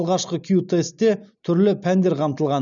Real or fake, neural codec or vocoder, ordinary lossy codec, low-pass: real; none; none; 7.2 kHz